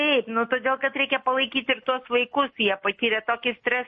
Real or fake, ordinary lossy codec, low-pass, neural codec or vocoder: real; MP3, 32 kbps; 10.8 kHz; none